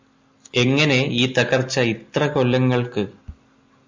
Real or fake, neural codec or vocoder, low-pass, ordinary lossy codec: real; none; 7.2 kHz; MP3, 64 kbps